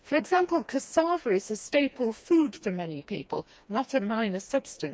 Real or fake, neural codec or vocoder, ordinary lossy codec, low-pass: fake; codec, 16 kHz, 2 kbps, FreqCodec, smaller model; none; none